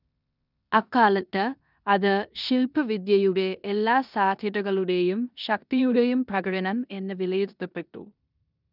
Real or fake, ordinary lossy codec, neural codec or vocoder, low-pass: fake; none; codec, 16 kHz in and 24 kHz out, 0.9 kbps, LongCat-Audio-Codec, four codebook decoder; 5.4 kHz